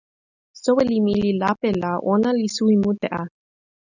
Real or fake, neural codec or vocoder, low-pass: real; none; 7.2 kHz